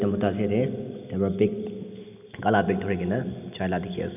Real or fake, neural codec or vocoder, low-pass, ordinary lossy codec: real; none; 3.6 kHz; none